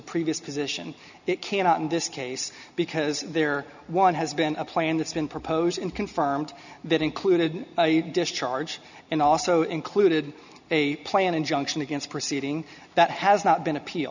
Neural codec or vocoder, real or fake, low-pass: none; real; 7.2 kHz